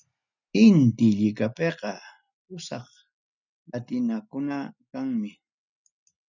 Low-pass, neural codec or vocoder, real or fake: 7.2 kHz; none; real